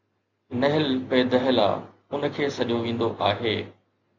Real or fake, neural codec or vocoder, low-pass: real; none; 7.2 kHz